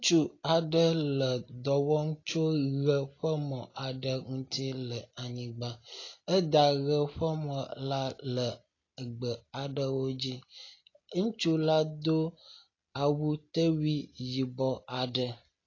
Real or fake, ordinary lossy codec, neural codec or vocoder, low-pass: real; AAC, 32 kbps; none; 7.2 kHz